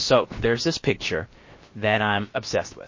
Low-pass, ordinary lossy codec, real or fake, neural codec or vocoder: 7.2 kHz; MP3, 32 kbps; fake; codec, 16 kHz, about 1 kbps, DyCAST, with the encoder's durations